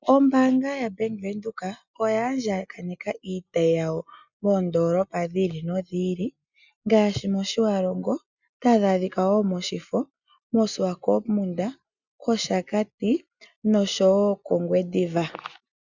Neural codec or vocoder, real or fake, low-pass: none; real; 7.2 kHz